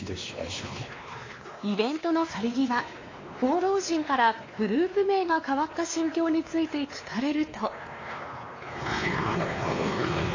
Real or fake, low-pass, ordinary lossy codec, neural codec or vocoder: fake; 7.2 kHz; AAC, 32 kbps; codec, 16 kHz, 2 kbps, X-Codec, WavLM features, trained on Multilingual LibriSpeech